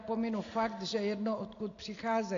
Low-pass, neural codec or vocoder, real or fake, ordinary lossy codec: 7.2 kHz; none; real; AAC, 48 kbps